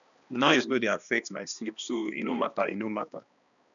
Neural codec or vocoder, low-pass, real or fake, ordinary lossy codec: codec, 16 kHz, 2 kbps, X-Codec, HuBERT features, trained on balanced general audio; 7.2 kHz; fake; none